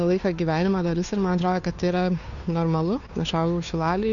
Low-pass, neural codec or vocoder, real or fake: 7.2 kHz; codec, 16 kHz, 2 kbps, FunCodec, trained on Chinese and English, 25 frames a second; fake